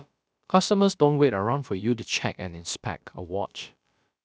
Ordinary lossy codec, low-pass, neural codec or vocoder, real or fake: none; none; codec, 16 kHz, about 1 kbps, DyCAST, with the encoder's durations; fake